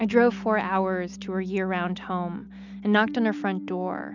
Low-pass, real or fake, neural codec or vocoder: 7.2 kHz; real; none